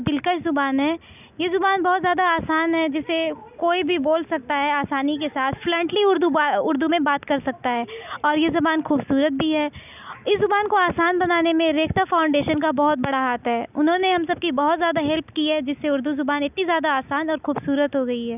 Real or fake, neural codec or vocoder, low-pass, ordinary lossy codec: real; none; 3.6 kHz; none